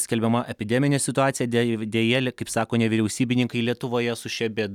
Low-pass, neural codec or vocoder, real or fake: 19.8 kHz; none; real